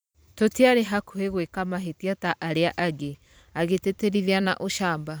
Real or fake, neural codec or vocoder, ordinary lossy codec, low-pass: fake; vocoder, 44.1 kHz, 128 mel bands every 512 samples, BigVGAN v2; none; none